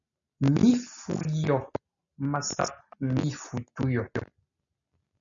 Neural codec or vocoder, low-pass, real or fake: none; 7.2 kHz; real